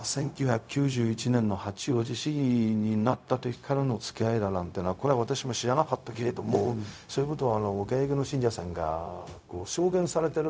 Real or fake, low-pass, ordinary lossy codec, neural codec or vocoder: fake; none; none; codec, 16 kHz, 0.4 kbps, LongCat-Audio-Codec